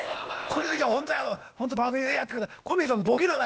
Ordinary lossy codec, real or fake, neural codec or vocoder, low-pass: none; fake; codec, 16 kHz, 0.8 kbps, ZipCodec; none